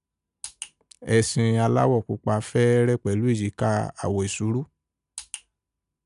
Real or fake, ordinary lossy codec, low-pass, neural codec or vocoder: real; none; 10.8 kHz; none